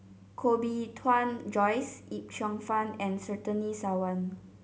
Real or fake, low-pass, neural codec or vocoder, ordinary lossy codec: real; none; none; none